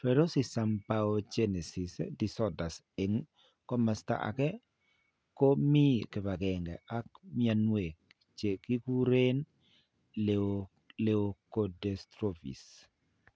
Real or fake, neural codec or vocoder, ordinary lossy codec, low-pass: real; none; none; none